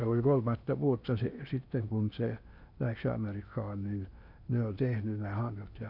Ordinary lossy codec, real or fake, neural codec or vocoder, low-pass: none; fake; codec, 16 kHz in and 24 kHz out, 0.8 kbps, FocalCodec, streaming, 65536 codes; 5.4 kHz